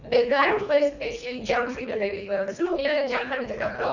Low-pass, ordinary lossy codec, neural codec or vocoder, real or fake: 7.2 kHz; none; codec, 24 kHz, 1.5 kbps, HILCodec; fake